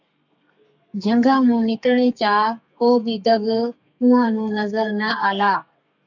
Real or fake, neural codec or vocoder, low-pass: fake; codec, 32 kHz, 1.9 kbps, SNAC; 7.2 kHz